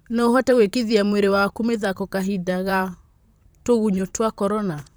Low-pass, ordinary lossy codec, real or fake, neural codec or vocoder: none; none; fake; vocoder, 44.1 kHz, 128 mel bands, Pupu-Vocoder